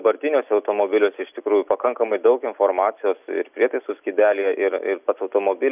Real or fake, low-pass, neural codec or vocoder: real; 3.6 kHz; none